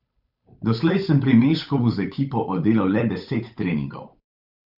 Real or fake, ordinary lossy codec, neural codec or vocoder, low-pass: fake; none; codec, 16 kHz, 8 kbps, FunCodec, trained on Chinese and English, 25 frames a second; 5.4 kHz